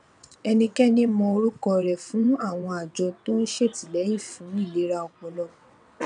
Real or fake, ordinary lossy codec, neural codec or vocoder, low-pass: fake; none; vocoder, 22.05 kHz, 80 mel bands, WaveNeXt; 9.9 kHz